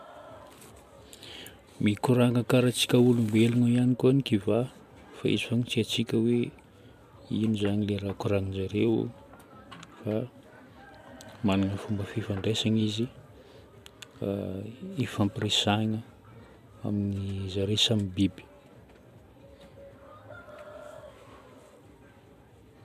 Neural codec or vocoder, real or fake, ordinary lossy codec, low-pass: none; real; none; 14.4 kHz